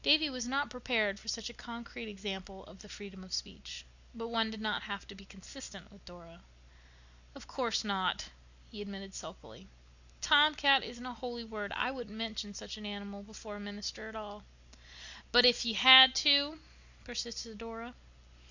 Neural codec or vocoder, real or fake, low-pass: none; real; 7.2 kHz